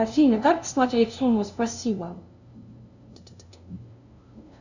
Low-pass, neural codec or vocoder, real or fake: 7.2 kHz; codec, 16 kHz, 0.5 kbps, FunCodec, trained on LibriTTS, 25 frames a second; fake